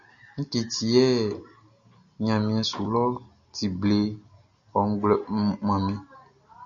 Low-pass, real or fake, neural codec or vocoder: 7.2 kHz; real; none